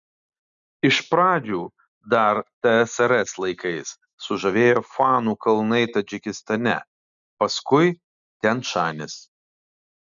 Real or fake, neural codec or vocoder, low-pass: real; none; 7.2 kHz